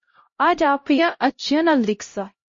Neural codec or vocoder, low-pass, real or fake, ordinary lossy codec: codec, 16 kHz, 0.5 kbps, X-Codec, HuBERT features, trained on LibriSpeech; 7.2 kHz; fake; MP3, 32 kbps